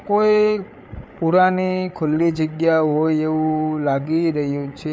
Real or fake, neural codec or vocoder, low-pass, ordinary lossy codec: fake; codec, 16 kHz, 8 kbps, FreqCodec, larger model; none; none